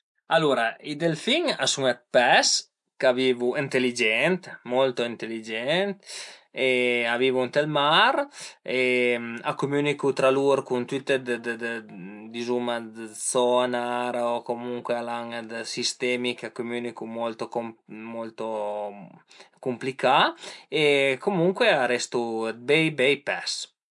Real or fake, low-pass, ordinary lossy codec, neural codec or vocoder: real; 10.8 kHz; MP3, 64 kbps; none